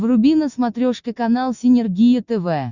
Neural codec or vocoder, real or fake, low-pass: none; real; 7.2 kHz